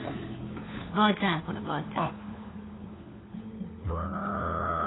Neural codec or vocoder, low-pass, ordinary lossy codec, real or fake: codec, 16 kHz, 4 kbps, FunCodec, trained on LibriTTS, 50 frames a second; 7.2 kHz; AAC, 16 kbps; fake